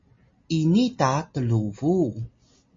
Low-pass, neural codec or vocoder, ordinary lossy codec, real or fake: 7.2 kHz; none; MP3, 32 kbps; real